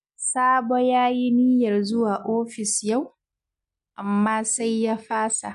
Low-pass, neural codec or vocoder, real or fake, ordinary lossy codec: 10.8 kHz; none; real; MP3, 64 kbps